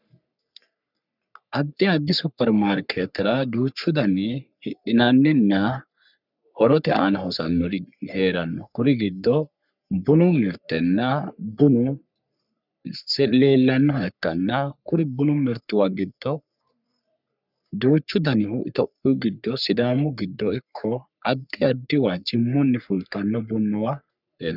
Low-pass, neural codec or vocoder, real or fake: 5.4 kHz; codec, 44.1 kHz, 3.4 kbps, Pupu-Codec; fake